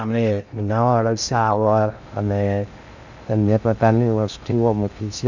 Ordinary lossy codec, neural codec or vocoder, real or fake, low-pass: none; codec, 16 kHz in and 24 kHz out, 0.6 kbps, FocalCodec, streaming, 2048 codes; fake; 7.2 kHz